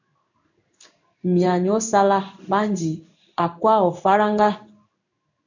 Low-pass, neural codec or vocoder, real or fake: 7.2 kHz; codec, 16 kHz in and 24 kHz out, 1 kbps, XY-Tokenizer; fake